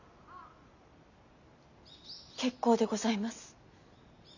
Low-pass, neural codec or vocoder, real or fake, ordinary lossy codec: 7.2 kHz; none; real; none